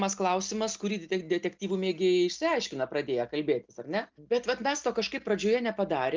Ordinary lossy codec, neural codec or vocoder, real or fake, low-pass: Opus, 24 kbps; none; real; 7.2 kHz